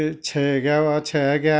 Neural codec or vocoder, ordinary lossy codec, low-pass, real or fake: none; none; none; real